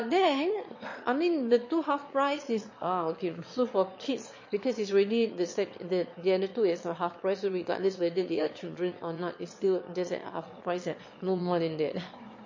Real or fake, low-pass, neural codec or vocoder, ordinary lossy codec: fake; 7.2 kHz; autoencoder, 22.05 kHz, a latent of 192 numbers a frame, VITS, trained on one speaker; MP3, 32 kbps